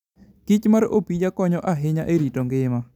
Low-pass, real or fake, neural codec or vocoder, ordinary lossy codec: 19.8 kHz; real; none; none